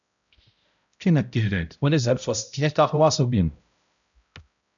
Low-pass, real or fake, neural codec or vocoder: 7.2 kHz; fake; codec, 16 kHz, 0.5 kbps, X-Codec, HuBERT features, trained on balanced general audio